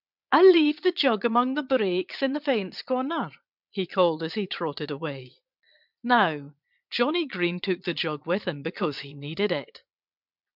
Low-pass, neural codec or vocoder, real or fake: 5.4 kHz; vocoder, 22.05 kHz, 80 mel bands, Vocos; fake